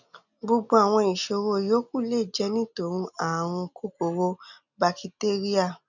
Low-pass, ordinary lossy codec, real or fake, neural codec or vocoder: 7.2 kHz; none; real; none